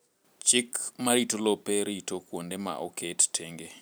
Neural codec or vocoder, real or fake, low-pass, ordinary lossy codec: vocoder, 44.1 kHz, 128 mel bands every 256 samples, BigVGAN v2; fake; none; none